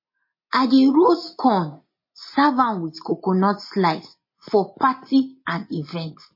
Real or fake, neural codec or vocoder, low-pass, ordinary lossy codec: real; none; 5.4 kHz; MP3, 24 kbps